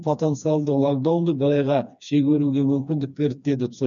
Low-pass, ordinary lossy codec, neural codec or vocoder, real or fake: 7.2 kHz; none; codec, 16 kHz, 2 kbps, FreqCodec, smaller model; fake